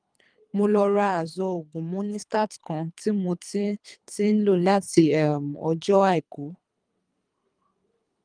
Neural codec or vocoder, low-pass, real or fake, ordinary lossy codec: codec, 24 kHz, 3 kbps, HILCodec; 9.9 kHz; fake; Opus, 32 kbps